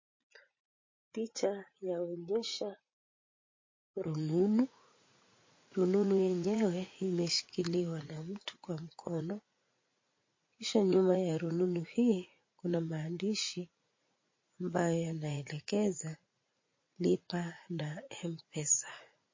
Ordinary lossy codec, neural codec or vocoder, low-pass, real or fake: MP3, 32 kbps; vocoder, 44.1 kHz, 80 mel bands, Vocos; 7.2 kHz; fake